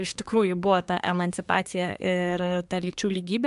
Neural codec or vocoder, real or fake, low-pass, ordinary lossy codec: codec, 24 kHz, 1 kbps, SNAC; fake; 10.8 kHz; MP3, 96 kbps